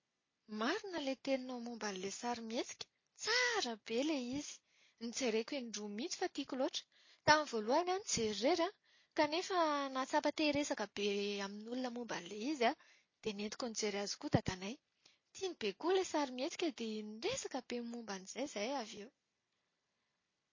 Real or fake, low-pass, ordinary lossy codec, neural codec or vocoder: real; 7.2 kHz; MP3, 32 kbps; none